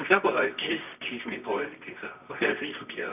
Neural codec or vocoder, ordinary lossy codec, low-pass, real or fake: codec, 24 kHz, 0.9 kbps, WavTokenizer, medium music audio release; none; 3.6 kHz; fake